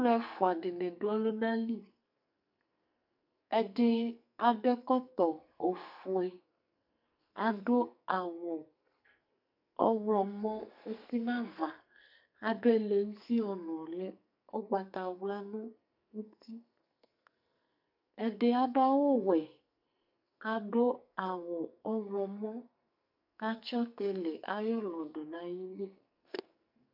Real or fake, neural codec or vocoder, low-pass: fake; codec, 44.1 kHz, 2.6 kbps, SNAC; 5.4 kHz